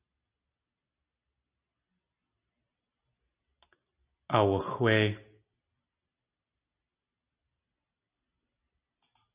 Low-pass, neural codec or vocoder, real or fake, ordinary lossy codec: 3.6 kHz; none; real; Opus, 64 kbps